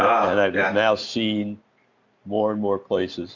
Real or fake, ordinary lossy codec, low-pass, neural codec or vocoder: real; Opus, 64 kbps; 7.2 kHz; none